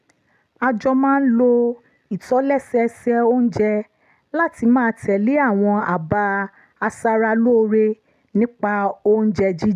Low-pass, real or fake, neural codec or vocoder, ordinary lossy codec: 14.4 kHz; real; none; none